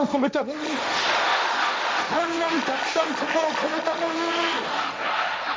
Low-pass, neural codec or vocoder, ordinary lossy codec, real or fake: none; codec, 16 kHz, 1.1 kbps, Voila-Tokenizer; none; fake